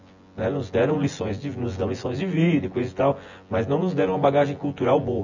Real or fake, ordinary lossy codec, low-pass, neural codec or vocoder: fake; none; 7.2 kHz; vocoder, 24 kHz, 100 mel bands, Vocos